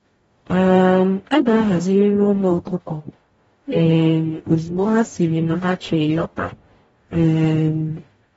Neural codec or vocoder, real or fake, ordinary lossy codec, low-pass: codec, 44.1 kHz, 0.9 kbps, DAC; fake; AAC, 24 kbps; 19.8 kHz